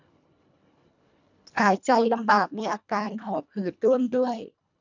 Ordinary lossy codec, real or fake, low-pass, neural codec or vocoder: none; fake; 7.2 kHz; codec, 24 kHz, 1.5 kbps, HILCodec